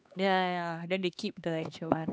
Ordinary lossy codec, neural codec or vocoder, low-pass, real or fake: none; codec, 16 kHz, 2 kbps, X-Codec, HuBERT features, trained on LibriSpeech; none; fake